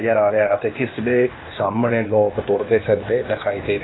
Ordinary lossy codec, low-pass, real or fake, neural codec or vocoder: AAC, 16 kbps; 7.2 kHz; fake; codec, 16 kHz, 0.8 kbps, ZipCodec